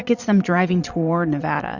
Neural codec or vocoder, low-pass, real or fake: vocoder, 22.05 kHz, 80 mel bands, Vocos; 7.2 kHz; fake